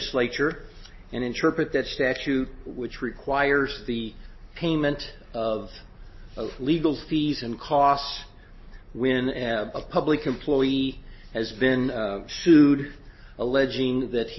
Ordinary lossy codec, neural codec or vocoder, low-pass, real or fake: MP3, 24 kbps; none; 7.2 kHz; real